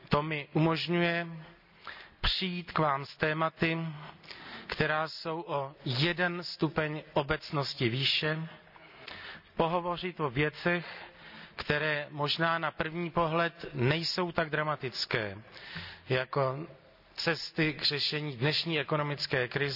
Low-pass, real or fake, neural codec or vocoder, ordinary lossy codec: 5.4 kHz; real; none; none